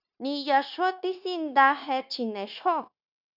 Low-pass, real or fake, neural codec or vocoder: 5.4 kHz; fake; codec, 16 kHz, 0.9 kbps, LongCat-Audio-Codec